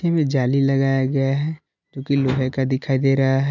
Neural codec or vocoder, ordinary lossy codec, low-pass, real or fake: none; none; 7.2 kHz; real